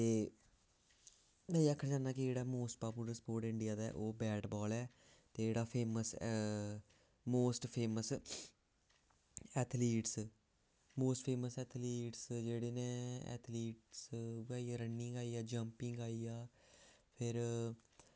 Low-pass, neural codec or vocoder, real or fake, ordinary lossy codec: none; none; real; none